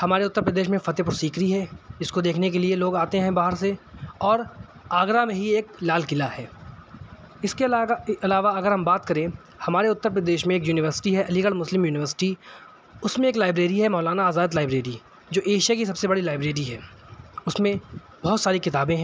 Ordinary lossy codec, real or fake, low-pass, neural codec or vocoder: none; real; none; none